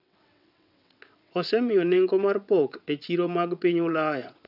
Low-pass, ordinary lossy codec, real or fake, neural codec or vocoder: 5.4 kHz; none; fake; vocoder, 22.05 kHz, 80 mel bands, WaveNeXt